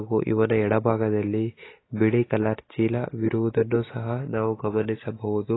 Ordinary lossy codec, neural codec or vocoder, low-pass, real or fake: AAC, 16 kbps; none; 7.2 kHz; real